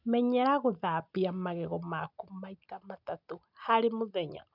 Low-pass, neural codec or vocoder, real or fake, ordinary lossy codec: 5.4 kHz; none; real; none